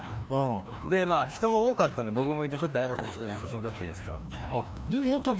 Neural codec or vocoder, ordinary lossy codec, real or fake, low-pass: codec, 16 kHz, 1 kbps, FreqCodec, larger model; none; fake; none